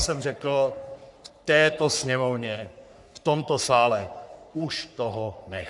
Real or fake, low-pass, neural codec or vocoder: fake; 10.8 kHz; codec, 44.1 kHz, 3.4 kbps, Pupu-Codec